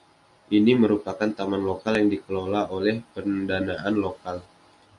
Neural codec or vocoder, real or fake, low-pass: none; real; 10.8 kHz